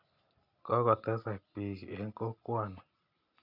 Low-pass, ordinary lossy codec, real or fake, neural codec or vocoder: 5.4 kHz; none; real; none